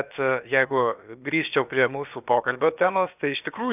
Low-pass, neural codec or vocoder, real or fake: 3.6 kHz; codec, 16 kHz, about 1 kbps, DyCAST, with the encoder's durations; fake